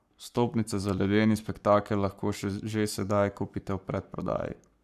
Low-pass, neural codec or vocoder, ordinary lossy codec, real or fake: 14.4 kHz; codec, 44.1 kHz, 7.8 kbps, Pupu-Codec; none; fake